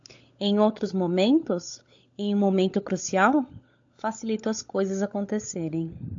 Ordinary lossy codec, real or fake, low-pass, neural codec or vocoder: AAC, 64 kbps; fake; 7.2 kHz; codec, 16 kHz, 16 kbps, FunCodec, trained on LibriTTS, 50 frames a second